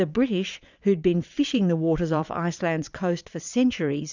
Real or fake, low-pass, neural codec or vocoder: fake; 7.2 kHz; vocoder, 22.05 kHz, 80 mel bands, Vocos